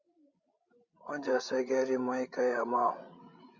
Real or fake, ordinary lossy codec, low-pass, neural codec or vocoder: fake; Opus, 64 kbps; 7.2 kHz; codec, 16 kHz, 16 kbps, FreqCodec, larger model